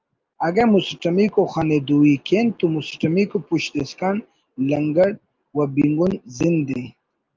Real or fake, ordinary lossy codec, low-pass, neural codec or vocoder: real; Opus, 24 kbps; 7.2 kHz; none